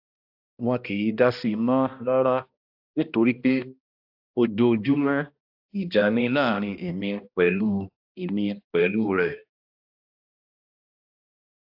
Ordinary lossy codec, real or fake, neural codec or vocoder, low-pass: AAC, 48 kbps; fake; codec, 16 kHz, 1 kbps, X-Codec, HuBERT features, trained on balanced general audio; 5.4 kHz